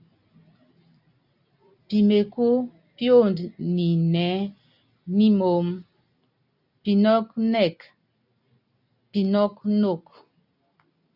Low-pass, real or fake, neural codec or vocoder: 5.4 kHz; real; none